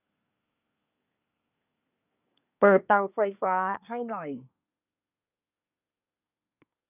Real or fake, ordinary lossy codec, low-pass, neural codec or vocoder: fake; none; 3.6 kHz; codec, 24 kHz, 1 kbps, SNAC